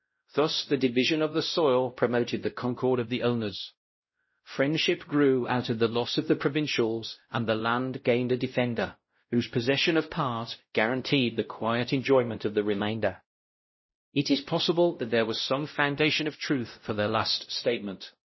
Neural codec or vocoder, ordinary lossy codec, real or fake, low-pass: codec, 16 kHz, 0.5 kbps, X-Codec, WavLM features, trained on Multilingual LibriSpeech; MP3, 24 kbps; fake; 7.2 kHz